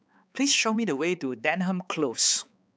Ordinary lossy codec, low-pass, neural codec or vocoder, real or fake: none; none; codec, 16 kHz, 4 kbps, X-Codec, HuBERT features, trained on balanced general audio; fake